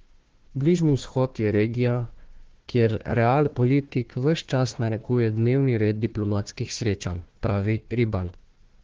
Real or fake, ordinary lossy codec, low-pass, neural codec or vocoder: fake; Opus, 16 kbps; 7.2 kHz; codec, 16 kHz, 1 kbps, FunCodec, trained on Chinese and English, 50 frames a second